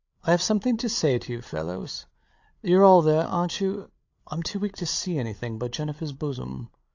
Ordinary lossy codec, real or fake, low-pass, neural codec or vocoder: AAC, 48 kbps; fake; 7.2 kHz; codec, 16 kHz, 8 kbps, FreqCodec, larger model